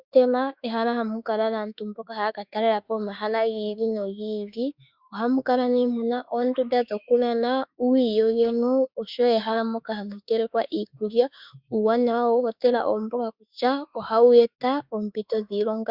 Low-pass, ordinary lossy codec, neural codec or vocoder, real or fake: 5.4 kHz; Opus, 64 kbps; autoencoder, 48 kHz, 32 numbers a frame, DAC-VAE, trained on Japanese speech; fake